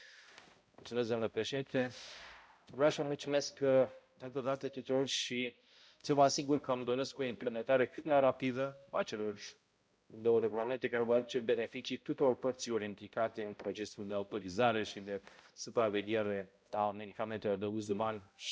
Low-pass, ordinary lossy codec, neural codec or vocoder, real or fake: none; none; codec, 16 kHz, 0.5 kbps, X-Codec, HuBERT features, trained on balanced general audio; fake